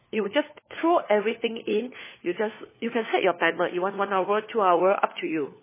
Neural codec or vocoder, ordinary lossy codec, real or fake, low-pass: codec, 16 kHz, 4 kbps, FunCodec, trained on LibriTTS, 50 frames a second; MP3, 16 kbps; fake; 3.6 kHz